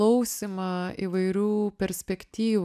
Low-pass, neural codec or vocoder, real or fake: 14.4 kHz; none; real